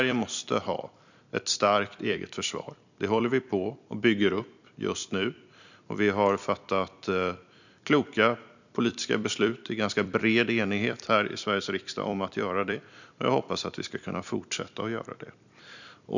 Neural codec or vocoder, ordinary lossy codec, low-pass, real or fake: vocoder, 44.1 kHz, 128 mel bands every 256 samples, BigVGAN v2; none; 7.2 kHz; fake